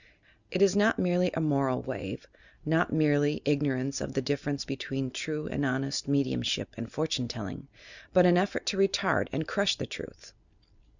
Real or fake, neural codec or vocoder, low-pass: real; none; 7.2 kHz